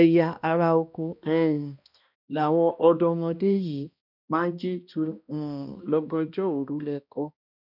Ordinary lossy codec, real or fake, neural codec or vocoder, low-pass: none; fake; codec, 16 kHz, 1 kbps, X-Codec, HuBERT features, trained on balanced general audio; 5.4 kHz